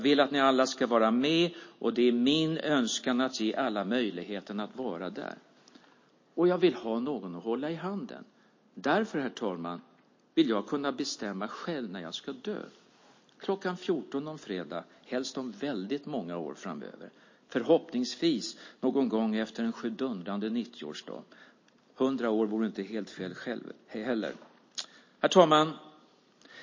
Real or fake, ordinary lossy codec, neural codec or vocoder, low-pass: real; MP3, 32 kbps; none; 7.2 kHz